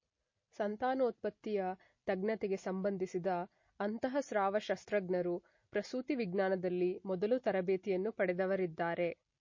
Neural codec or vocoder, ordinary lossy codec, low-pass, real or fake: none; MP3, 32 kbps; 7.2 kHz; real